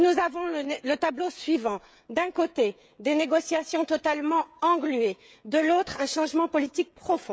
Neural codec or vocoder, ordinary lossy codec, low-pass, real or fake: codec, 16 kHz, 16 kbps, FreqCodec, smaller model; none; none; fake